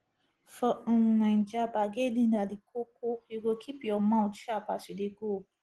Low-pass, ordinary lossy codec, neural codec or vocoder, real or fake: 10.8 kHz; Opus, 16 kbps; none; real